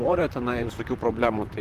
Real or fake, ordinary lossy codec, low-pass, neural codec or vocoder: fake; Opus, 24 kbps; 14.4 kHz; vocoder, 44.1 kHz, 128 mel bands, Pupu-Vocoder